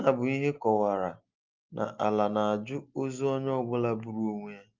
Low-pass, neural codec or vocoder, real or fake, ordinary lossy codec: 7.2 kHz; none; real; Opus, 24 kbps